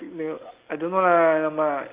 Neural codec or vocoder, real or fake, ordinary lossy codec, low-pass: none; real; Opus, 32 kbps; 3.6 kHz